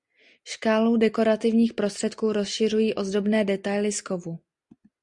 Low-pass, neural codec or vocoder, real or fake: 10.8 kHz; none; real